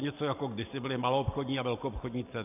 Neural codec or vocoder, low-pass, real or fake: none; 3.6 kHz; real